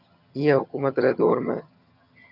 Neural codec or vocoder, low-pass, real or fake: vocoder, 22.05 kHz, 80 mel bands, HiFi-GAN; 5.4 kHz; fake